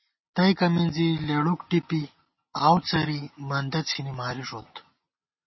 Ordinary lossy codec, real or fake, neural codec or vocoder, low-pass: MP3, 24 kbps; real; none; 7.2 kHz